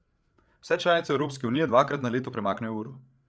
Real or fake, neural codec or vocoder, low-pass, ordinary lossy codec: fake; codec, 16 kHz, 8 kbps, FreqCodec, larger model; none; none